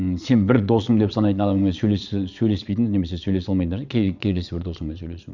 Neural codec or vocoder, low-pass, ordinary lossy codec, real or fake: vocoder, 22.05 kHz, 80 mel bands, Vocos; 7.2 kHz; none; fake